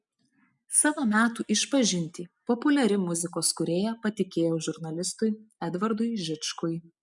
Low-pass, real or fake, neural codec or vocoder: 10.8 kHz; real; none